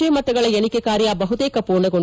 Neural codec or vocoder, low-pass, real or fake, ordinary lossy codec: none; none; real; none